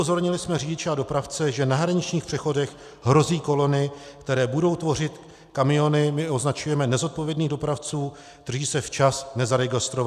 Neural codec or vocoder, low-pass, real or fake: none; 14.4 kHz; real